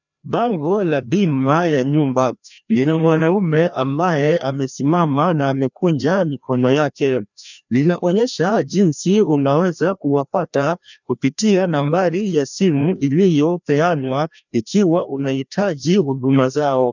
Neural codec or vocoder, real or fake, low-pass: codec, 16 kHz, 1 kbps, FreqCodec, larger model; fake; 7.2 kHz